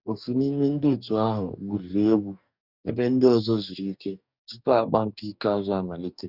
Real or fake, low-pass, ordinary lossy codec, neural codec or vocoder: fake; 5.4 kHz; none; codec, 44.1 kHz, 2.6 kbps, DAC